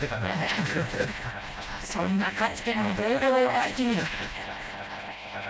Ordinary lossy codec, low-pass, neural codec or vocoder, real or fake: none; none; codec, 16 kHz, 0.5 kbps, FreqCodec, smaller model; fake